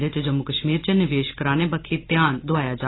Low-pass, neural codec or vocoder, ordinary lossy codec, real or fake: 7.2 kHz; none; AAC, 16 kbps; real